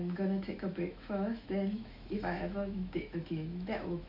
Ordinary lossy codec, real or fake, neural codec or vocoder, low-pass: MP3, 48 kbps; fake; autoencoder, 48 kHz, 128 numbers a frame, DAC-VAE, trained on Japanese speech; 5.4 kHz